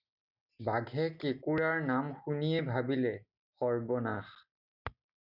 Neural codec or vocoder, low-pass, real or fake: none; 5.4 kHz; real